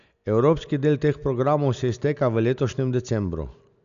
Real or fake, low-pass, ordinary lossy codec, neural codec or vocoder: real; 7.2 kHz; none; none